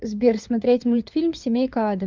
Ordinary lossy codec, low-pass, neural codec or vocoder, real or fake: Opus, 24 kbps; 7.2 kHz; codec, 16 kHz, 4 kbps, FunCodec, trained on LibriTTS, 50 frames a second; fake